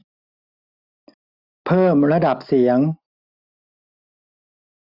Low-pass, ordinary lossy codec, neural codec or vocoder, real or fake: 5.4 kHz; none; none; real